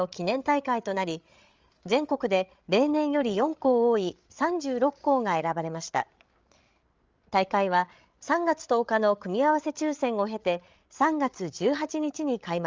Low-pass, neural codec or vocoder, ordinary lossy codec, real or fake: 7.2 kHz; codec, 16 kHz, 8 kbps, FreqCodec, larger model; Opus, 32 kbps; fake